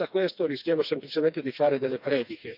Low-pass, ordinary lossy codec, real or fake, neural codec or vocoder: 5.4 kHz; none; fake; codec, 16 kHz, 2 kbps, FreqCodec, smaller model